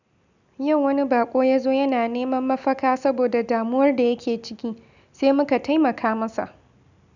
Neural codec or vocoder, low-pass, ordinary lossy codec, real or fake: none; 7.2 kHz; none; real